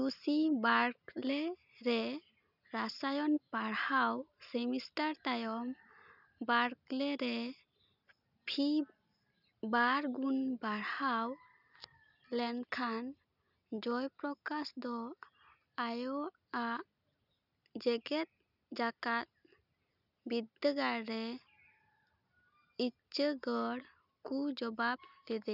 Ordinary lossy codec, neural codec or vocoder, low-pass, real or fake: none; none; 5.4 kHz; real